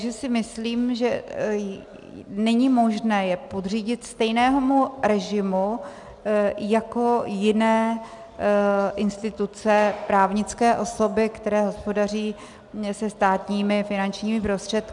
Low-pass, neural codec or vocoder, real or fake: 10.8 kHz; none; real